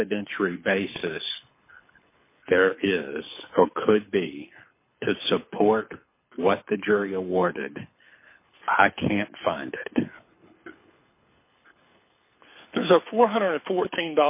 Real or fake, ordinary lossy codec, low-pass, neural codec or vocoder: fake; MP3, 24 kbps; 3.6 kHz; codec, 24 kHz, 6 kbps, HILCodec